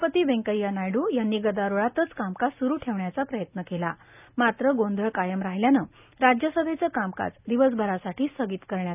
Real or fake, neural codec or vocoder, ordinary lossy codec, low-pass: real; none; none; 3.6 kHz